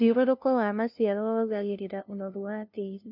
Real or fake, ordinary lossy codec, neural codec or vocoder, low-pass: fake; none; codec, 16 kHz, 0.5 kbps, FunCodec, trained on LibriTTS, 25 frames a second; 5.4 kHz